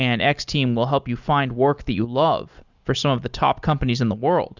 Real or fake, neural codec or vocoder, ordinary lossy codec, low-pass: real; none; Opus, 64 kbps; 7.2 kHz